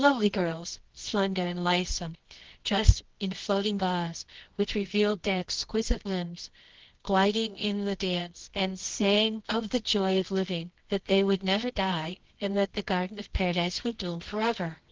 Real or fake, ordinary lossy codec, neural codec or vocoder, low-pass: fake; Opus, 16 kbps; codec, 24 kHz, 0.9 kbps, WavTokenizer, medium music audio release; 7.2 kHz